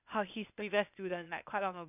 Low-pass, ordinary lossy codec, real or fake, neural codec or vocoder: 3.6 kHz; none; fake; codec, 16 kHz, 0.8 kbps, ZipCodec